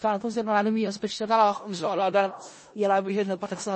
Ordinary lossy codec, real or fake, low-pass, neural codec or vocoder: MP3, 32 kbps; fake; 9.9 kHz; codec, 16 kHz in and 24 kHz out, 0.4 kbps, LongCat-Audio-Codec, four codebook decoder